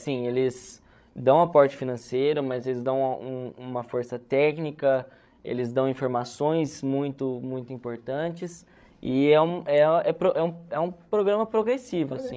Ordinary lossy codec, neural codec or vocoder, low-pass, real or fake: none; codec, 16 kHz, 16 kbps, FreqCodec, larger model; none; fake